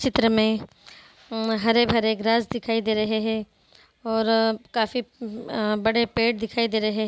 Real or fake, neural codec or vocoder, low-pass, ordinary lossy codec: real; none; none; none